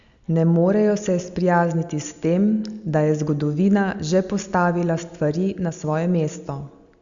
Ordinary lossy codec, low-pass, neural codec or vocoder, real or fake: Opus, 64 kbps; 7.2 kHz; none; real